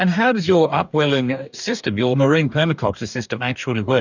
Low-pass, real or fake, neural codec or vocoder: 7.2 kHz; fake; codec, 44.1 kHz, 2.6 kbps, DAC